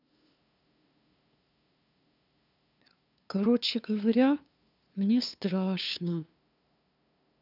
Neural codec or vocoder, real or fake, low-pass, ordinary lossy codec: codec, 16 kHz, 2 kbps, FunCodec, trained on LibriTTS, 25 frames a second; fake; 5.4 kHz; none